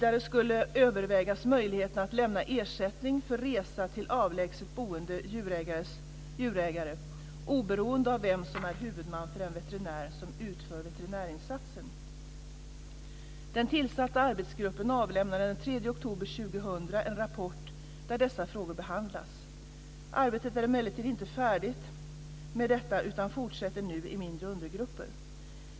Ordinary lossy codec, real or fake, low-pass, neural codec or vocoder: none; real; none; none